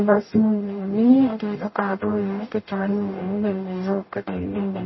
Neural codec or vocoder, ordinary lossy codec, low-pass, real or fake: codec, 44.1 kHz, 0.9 kbps, DAC; MP3, 24 kbps; 7.2 kHz; fake